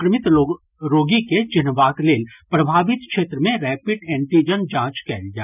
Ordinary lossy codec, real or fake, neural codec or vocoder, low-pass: none; real; none; 3.6 kHz